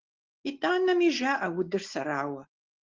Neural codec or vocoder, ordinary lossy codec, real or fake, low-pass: none; Opus, 16 kbps; real; 7.2 kHz